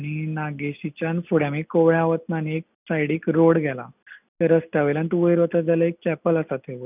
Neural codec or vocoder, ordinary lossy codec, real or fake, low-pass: none; none; real; 3.6 kHz